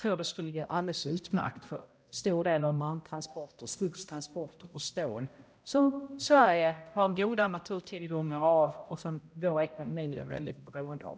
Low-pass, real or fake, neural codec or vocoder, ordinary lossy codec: none; fake; codec, 16 kHz, 0.5 kbps, X-Codec, HuBERT features, trained on balanced general audio; none